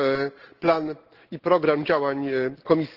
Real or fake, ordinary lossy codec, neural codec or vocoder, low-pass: real; Opus, 16 kbps; none; 5.4 kHz